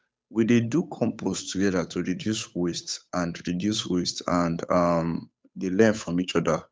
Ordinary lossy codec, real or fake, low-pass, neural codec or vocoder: none; fake; none; codec, 16 kHz, 8 kbps, FunCodec, trained on Chinese and English, 25 frames a second